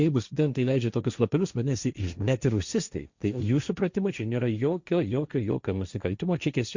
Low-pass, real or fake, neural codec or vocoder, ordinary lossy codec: 7.2 kHz; fake; codec, 16 kHz, 1.1 kbps, Voila-Tokenizer; Opus, 64 kbps